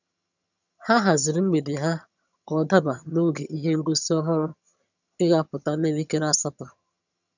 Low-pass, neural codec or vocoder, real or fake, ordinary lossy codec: 7.2 kHz; vocoder, 22.05 kHz, 80 mel bands, HiFi-GAN; fake; none